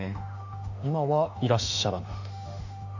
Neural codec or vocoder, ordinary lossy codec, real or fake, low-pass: autoencoder, 48 kHz, 32 numbers a frame, DAC-VAE, trained on Japanese speech; none; fake; 7.2 kHz